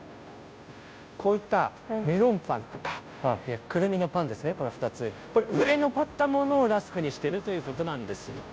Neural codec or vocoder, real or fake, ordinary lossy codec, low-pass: codec, 16 kHz, 0.5 kbps, FunCodec, trained on Chinese and English, 25 frames a second; fake; none; none